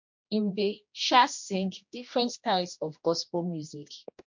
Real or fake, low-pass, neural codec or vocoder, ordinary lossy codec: fake; 7.2 kHz; codec, 16 kHz, 1 kbps, X-Codec, HuBERT features, trained on general audio; MP3, 48 kbps